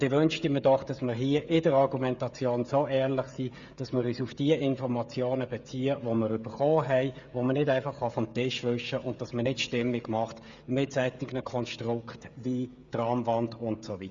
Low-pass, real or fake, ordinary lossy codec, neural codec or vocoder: 7.2 kHz; fake; Opus, 64 kbps; codec, 16 kHz, 16 kbps, FreqCodec, smaller model